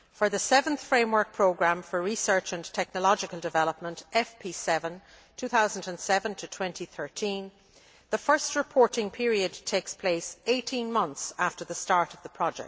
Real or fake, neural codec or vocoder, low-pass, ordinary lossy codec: real; none; none; none